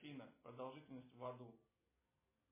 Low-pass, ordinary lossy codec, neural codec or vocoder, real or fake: 3.6 kHz; MP3, 16 kbps; none; real